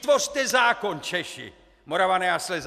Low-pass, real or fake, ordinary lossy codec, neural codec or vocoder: 14.4 kHz; real; MP3, 96 kbps; none